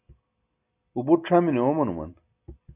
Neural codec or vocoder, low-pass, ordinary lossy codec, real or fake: none; 3.6 kHz; AAC, 32 kbps; real